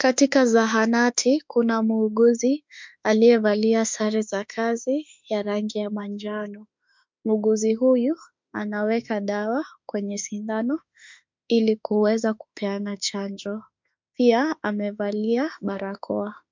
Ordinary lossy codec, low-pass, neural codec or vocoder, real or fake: MP3, 64 kbps; 7.2 kHz; autoencoder, 48 kHz, 32 numbers a frame, DAC-VAE, trained on Japanese speech; fake